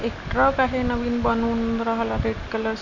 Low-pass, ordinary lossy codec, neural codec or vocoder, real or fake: 7.2 kHz; none; none; real